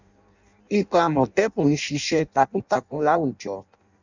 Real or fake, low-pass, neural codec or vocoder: fake; 7.2 kHz; codec, 16 kHz in and 24 kHz out, 0.6 kbps, FireRedTTS-2 codec